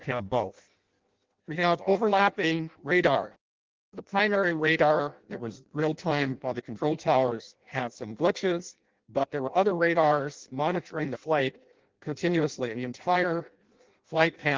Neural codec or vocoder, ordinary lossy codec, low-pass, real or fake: codec, 16 kHz in and 24 kHz out, 0.6 kbps, FireRedTTS-2 codec; Opus, 16 kbps; 7.2 kHz; fake